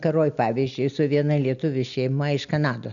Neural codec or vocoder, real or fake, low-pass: none; real; 7.2 kHz